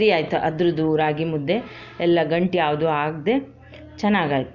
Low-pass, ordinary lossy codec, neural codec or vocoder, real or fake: 7.2 kHz; none; none; real